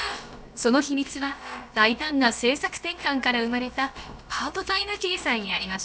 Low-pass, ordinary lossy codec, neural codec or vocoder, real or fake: none; none; codec, 16 kHz, about 1 kbps, DyCAST, with the encoder's durations; fake